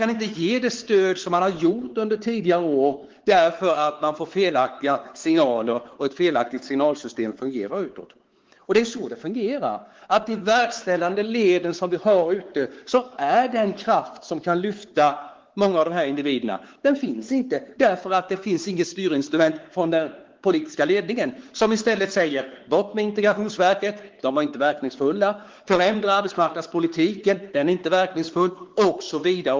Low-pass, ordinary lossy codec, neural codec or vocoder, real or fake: 7.2 kHz; Opus, 16 kbps; codec, 16 kHz, 4 kbps, X-Codec, WavLM features, trained on Multilingual LibriSpeech; fake